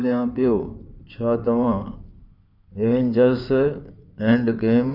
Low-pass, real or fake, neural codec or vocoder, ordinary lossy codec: 5.4 kHz; fake; codec, 16 kHz in and 24 kHz out, 2.2 kbps, FireRedTTS-2 codec; none